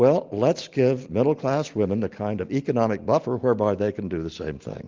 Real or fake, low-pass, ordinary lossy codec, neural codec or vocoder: real; 7.2 kHz; Opus, 32 kbps; none